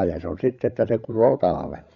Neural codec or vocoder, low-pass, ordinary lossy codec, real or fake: codec, 16 kHz, 16 kbps, FreqCodec, larger model; 7.2 kHz; MP3, 96 kbps; fake